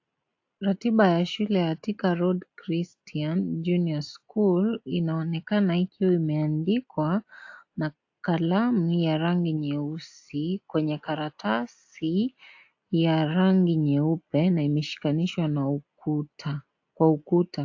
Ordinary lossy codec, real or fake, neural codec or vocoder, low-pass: AAC, 48 kbps; real; none; 7.2 kHz